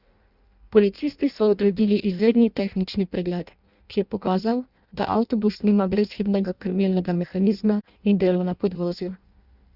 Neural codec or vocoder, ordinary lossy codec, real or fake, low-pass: codec, 16 kHz in and 24 kHz out, 0.6 kbps, FireRedTTS-2 codec; Opus, 64 kbps; fake; 5.4 kHz